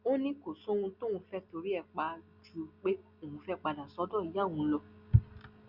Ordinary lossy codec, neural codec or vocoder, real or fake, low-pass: Opus, 64 kbps; none; real; 5.4 kHz